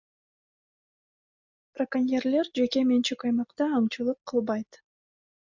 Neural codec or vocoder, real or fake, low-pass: none; real; 7.2 kHz